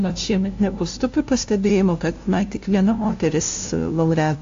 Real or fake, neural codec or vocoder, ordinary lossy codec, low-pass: fake; codec, 16 kHz, 0.5 kbps, FunCodec, trained on LibriTTS, 25 frames a second; AAC, 48 kbps; 7.2 kHz